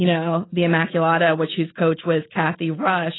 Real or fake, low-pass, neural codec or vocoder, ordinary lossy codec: real; 7.2 kHz; none; AAC, 16 kbps